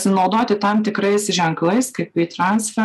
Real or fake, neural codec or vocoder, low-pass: real; none; 14.4 kHz